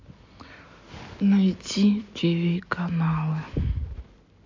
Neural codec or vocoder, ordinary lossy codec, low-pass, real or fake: none; MP3, 64 kbps; 7.2 kHz; real